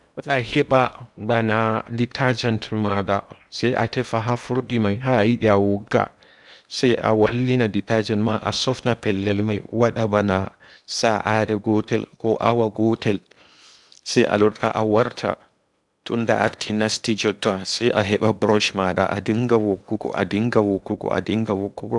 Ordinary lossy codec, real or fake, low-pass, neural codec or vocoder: none; fake; 10.8 kHz; codec, 16 kHz in and 24 kHz out, 0.8 kbps, FocalCodec, streaming, 65536 codes